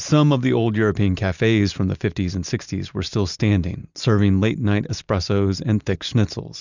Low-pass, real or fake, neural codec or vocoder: 7.2 kHz; real; none